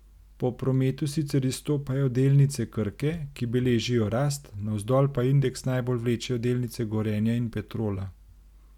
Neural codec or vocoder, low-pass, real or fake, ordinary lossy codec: none; 19.8 kHz; real; none